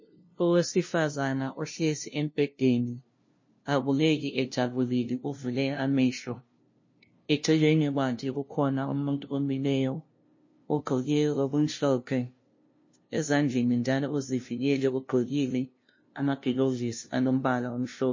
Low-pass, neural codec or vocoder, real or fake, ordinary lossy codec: 7.2 kHz; codec, 16 kHz, 0.5 kbps, FunCodec, trained on LibriTTS, 25 frames a second; fake; MP3, 32 kbps